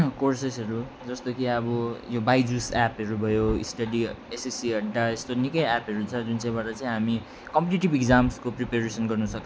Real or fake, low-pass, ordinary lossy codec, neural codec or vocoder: real; none; none; none